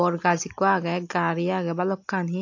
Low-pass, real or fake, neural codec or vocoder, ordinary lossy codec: 7.2 kHz; real; none; AAC, 48 kbps